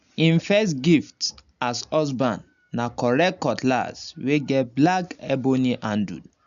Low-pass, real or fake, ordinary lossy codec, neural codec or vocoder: 7.2 kHz; real; none; none